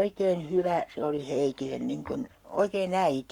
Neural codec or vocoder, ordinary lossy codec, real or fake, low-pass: codec, 44.1 kHz, 7.8 kbps, Pupu-Codec; Opus, 64 kbps; fake; 19.8 kHz